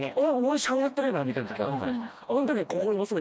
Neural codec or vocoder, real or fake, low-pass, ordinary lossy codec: codec, 16 kHz, 1 kbps, FreqCodec, smaller model; fake; none; none